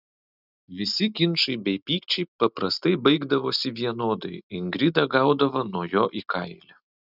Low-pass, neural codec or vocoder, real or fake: 5.4 kHz; none; real